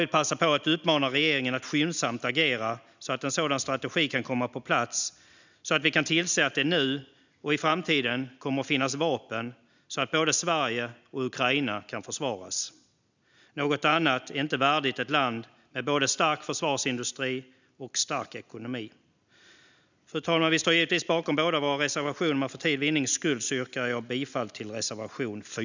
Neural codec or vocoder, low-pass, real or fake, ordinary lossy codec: none; 7.2 kHz; real; none